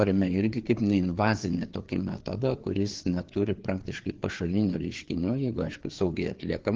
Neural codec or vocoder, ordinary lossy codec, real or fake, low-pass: codec, 16 kHz, 4 kbps, FreqCodec, larger model; Opus, 16 kbps; fake; 7.2 kHz